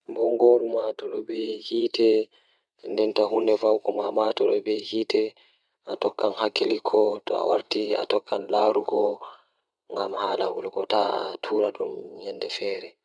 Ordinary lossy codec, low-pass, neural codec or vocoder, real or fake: none; none; vocoder, 22.05 kHz, 80 mel bands, WaveNeXt; fake